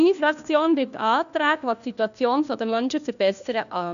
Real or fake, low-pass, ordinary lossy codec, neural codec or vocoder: fake; 7.2 kHz; none; codec, 16 kHz, 1 kbps, FunCodec, trained on LibriTTS, 50 frames a second